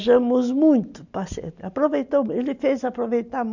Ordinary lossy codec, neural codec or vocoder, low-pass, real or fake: none; none; 7.2 kHz; real